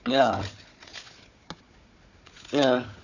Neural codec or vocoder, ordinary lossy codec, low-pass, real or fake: codec, 16 kHz, 4 kbps, FunCodec, trained on Chinese and English, 50 frames a second; none; 7.2 kHz; fake